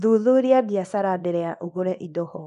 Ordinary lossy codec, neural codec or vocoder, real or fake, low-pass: none; codec, 24 kHz, 0.9 kbps, WavTokenizer, small release; fake; 10.8 kHz